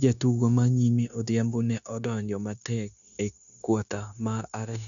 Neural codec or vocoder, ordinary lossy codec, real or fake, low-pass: codec, 16 kHz, 0.9 kbps, LongCat-Audio-Codec; none; fake; 7.2 kHz